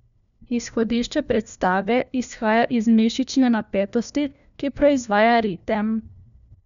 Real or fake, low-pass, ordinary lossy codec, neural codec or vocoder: fake; 7.2 kHz; none; codec, 16 kHz, 1 kbps, FunCodec, trained on LibriTTS, 50 frames a second